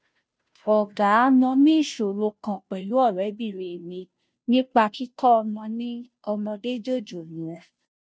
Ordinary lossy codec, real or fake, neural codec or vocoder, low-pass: none; fake; codec, 16 kHz, 0.5 kbps, FunCodec, trained on Chinese and English, 25 frames a second; none